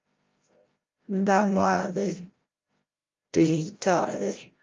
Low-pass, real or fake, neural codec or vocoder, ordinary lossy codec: 7.2 kHz; fake; codec, 16 kHz, 0.5 kbps, FreqCodec, larger model; Opus, 32 kbps